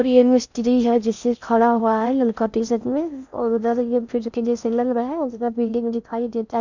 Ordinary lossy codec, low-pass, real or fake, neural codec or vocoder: none; 7.2 kHz; fake; codec, 16 kHz in and 24 kHz out, 0.6 kbps, FocalCodec, streaming, 4096 codes